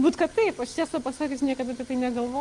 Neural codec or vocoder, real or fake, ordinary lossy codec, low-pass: none; real; Opus, 24 kbps; 10.8 kHz